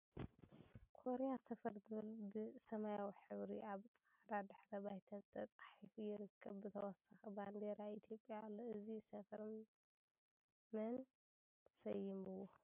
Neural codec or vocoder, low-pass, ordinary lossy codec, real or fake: none; 3.6 kHz; AAC, 32 kbps; real